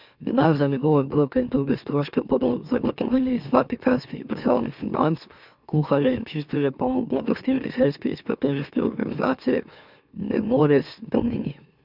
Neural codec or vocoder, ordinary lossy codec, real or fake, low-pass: autoencoder, 44.1 kHz, a latent of 192 numbers a frame, MeloTTS; none; fake; 5.4 kHz